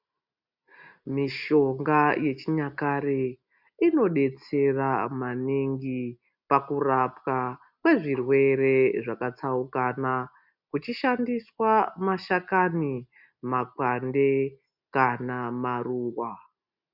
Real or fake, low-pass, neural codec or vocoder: real; 5.4 kHz; none